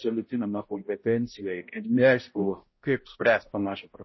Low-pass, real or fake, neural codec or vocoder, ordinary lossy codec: 7.2 kHz; fake; codec, 16 kHz, 0.5 kbps, X-Codec, HuBERT features, trained on balanced general audio; MP3, 24 kbps